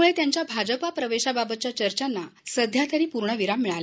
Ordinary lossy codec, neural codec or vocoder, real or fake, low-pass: none; none; real; none